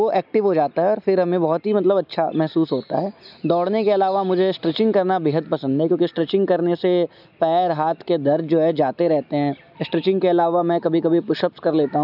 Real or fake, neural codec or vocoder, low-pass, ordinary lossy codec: real; none; 5.4 kHz; none